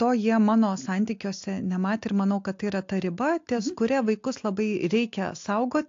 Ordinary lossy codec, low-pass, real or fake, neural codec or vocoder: AAC, 48 kbps; 7.2 kHz; real; none